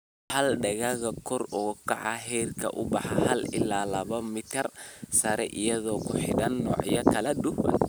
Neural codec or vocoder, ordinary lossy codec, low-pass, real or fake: none; none; none; real